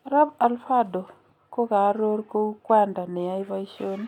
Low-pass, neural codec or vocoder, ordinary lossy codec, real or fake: 19.8 kHz; none; none; real